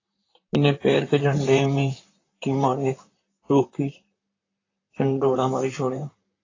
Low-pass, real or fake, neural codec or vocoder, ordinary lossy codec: 7.2 kHz; fake; vocoder, 44.1 kHz, 128 mel bands, Pupu-Vocoder; AAC, 32 kbps